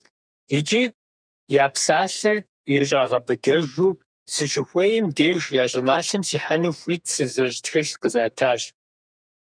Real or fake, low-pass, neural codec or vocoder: fake; 9.9 kHz; codec, 32 kHz, 1.9 kbps, SNAC